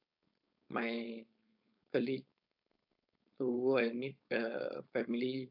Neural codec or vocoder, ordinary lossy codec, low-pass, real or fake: codec, 16 kHz, 4.8 kbps, FACodec; AAC, 48 kbps; 5.4 kHz; fake